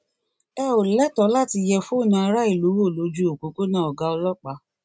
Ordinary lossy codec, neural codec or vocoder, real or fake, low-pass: none; none; real; none